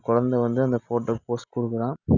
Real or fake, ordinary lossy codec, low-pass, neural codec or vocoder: real; none; 7.2 kHz; none